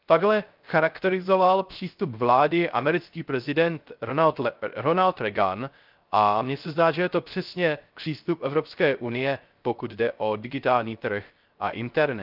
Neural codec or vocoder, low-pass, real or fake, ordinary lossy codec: codec, 16 kHz, 0.3 kbps, FocalCodec; 5.4 kHz; fake; Opus, 24 kbps